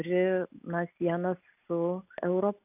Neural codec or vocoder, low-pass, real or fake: none; 3.6 kHz; real